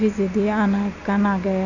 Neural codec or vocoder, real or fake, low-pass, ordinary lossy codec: none; real; 7.2 kHz; none